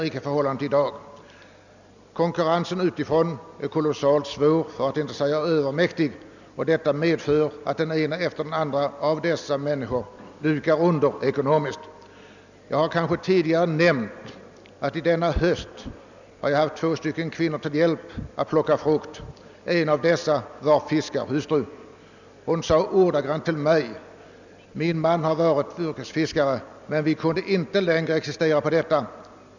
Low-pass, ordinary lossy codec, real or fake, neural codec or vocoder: 7.2 kHz; none; real; none